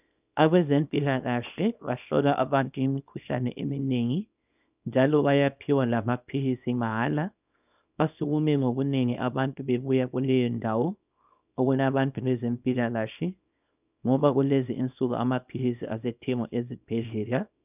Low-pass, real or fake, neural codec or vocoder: 3.6 kHz; fake; codec, 24 kHz, 0.9 kbps, WavTokenizer, small release